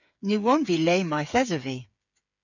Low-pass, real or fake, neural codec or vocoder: 7.2 kHz; fake; codec, 16 kHz, 8 kbps, FreqCodec, smaller model